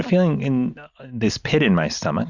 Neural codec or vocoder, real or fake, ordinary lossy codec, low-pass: none; real; Opus, 64 kbps; 7.2 kHz